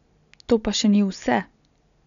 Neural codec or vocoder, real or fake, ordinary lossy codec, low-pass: none; real; none; 7.2 kHz